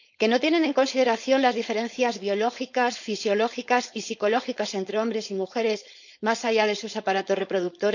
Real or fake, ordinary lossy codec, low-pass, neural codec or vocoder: fake; none; 7.2 kHz; codec, 16 kHz, 4.8 kbps, FACodec